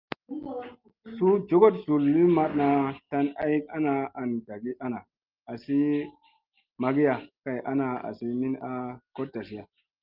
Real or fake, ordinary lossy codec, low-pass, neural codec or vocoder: real; Opus, 32 kbps; 5.4 kHz; none